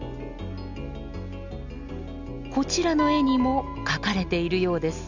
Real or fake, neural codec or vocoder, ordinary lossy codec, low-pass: real; none; none; 7.2 kHz